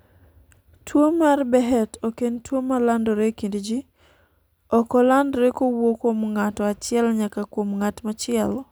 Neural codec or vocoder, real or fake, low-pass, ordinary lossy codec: none; real; none; none